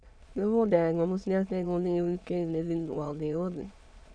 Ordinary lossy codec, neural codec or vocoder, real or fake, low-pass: none; autoencoder, 22.05 kHz, a latent of 192 numbers a frame, VITS, trained on many speakers; fake; 9.9 kHz